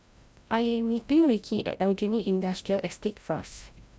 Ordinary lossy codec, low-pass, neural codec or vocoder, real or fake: none; none; codec, 16 kHz, 0.5 kbps, FreqCodec, larger model; fake